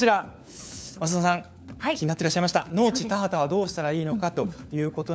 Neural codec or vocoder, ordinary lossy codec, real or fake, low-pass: codec, 16 kHz, 4 kbps, FunCodec, trained on LibriTTS, 50 frames a second; none; fake; none